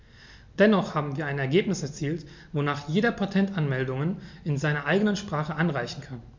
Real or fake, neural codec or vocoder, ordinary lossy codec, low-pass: real; none; MP3, 64 kbps; 7.2 kHz